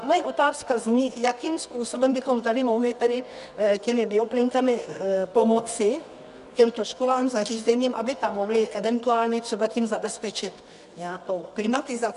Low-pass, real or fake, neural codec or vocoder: 10.8 kHz; fake; codec, 24 kHz, 0.9 kbps, WavTokenizer, medium music audio release